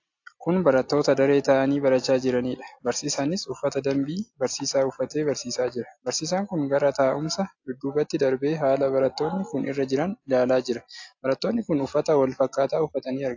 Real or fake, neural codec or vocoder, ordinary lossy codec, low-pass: real; none; AAC, 48 kbps; 7.2 kHz